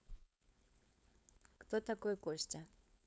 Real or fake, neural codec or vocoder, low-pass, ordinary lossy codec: fake; codec, 16 kHz, 4.8 kbps, FACodec; none; none